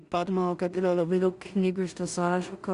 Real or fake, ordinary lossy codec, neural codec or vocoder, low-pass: fake; Opus, 64 kbps; codec, 16 kHz in and 24 kHz out, 0.4 kbps, LongCat-Audio-Codec, two codebook decoder; 10.8 kHz